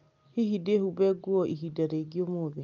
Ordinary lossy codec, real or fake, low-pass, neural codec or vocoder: Opus, 24 kbps; real; 7.2 kHz; none